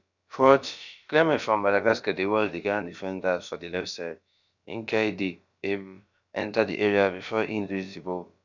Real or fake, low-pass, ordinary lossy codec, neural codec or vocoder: fake; 7.2 kHz; none; codec, 16 kHz, about 1 kbps, DyCAST, with the encoder's durations